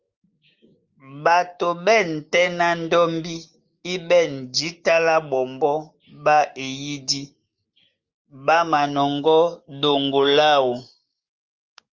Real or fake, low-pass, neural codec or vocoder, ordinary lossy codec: fake; 7.2 kHz; codec, 16 kHz, 6 kbps, DAC; Opus, 32 kbps